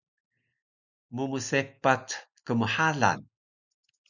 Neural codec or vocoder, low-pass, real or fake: none; 7.2 kHz; real